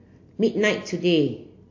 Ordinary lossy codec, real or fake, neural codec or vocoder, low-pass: AAC, 48 kbps; real; none; 7.2 kHz